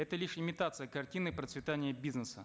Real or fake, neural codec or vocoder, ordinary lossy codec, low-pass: real; none; none; none